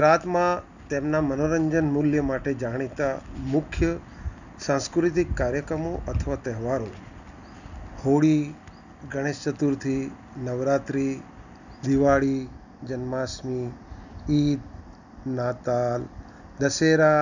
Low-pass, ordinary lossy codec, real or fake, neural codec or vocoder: 7.2 kHz; none; real; none